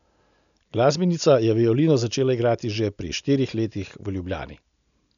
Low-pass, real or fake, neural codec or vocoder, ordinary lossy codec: 7.2 kHz; real; none; none